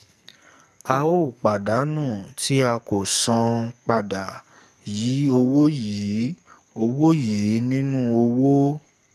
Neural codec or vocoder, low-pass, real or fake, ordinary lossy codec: codec, 44.1 kHz, 2.6 kbps, SNAC; 14.4 kHz; fake; none